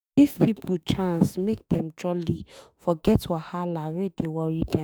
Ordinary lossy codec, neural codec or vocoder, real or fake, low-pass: none; autoencoder, 48 kHz, 32 numbers a frame, DAC-VAE, trained on Japanese speech; fake; none